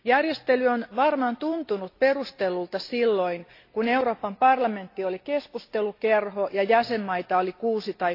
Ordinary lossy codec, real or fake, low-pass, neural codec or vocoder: AAC, 32 kbps; real; 5.4 kHz; none